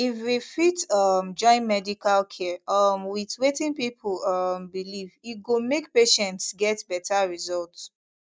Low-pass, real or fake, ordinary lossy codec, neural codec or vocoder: none; real; none; none